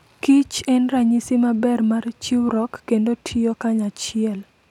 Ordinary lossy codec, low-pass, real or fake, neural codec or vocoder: none; 19.8 kHz; real; none